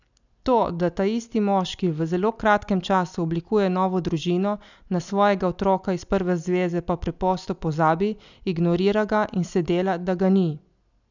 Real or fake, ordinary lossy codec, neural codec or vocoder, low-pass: real; none; none; 7.2 kHz